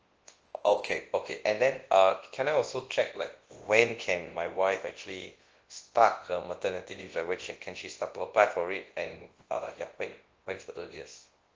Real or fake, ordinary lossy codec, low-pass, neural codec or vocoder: fake; Opus, 16 kbps; 7.2 kHz; codec, 24 kHz, 0.9 kbps, WavTokenizer, large speech release